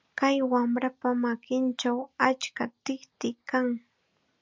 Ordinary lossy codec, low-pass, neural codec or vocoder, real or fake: MP3, 64 kbps; 7.2 kHz; none; real